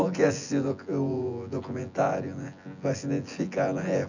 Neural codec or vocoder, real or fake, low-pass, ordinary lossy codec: vocoder, 24 kHz, 100 mel bands, Vocos; fake; 7.2 kHz; none